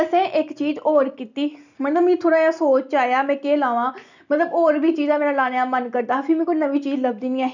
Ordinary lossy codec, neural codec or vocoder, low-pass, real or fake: none; none; 7.2 kHz; real